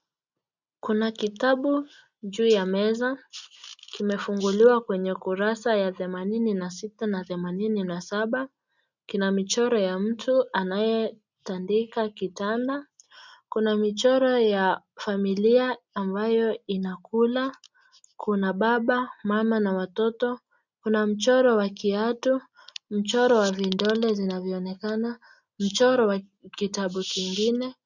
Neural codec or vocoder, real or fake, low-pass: none; real; 7.2 kHz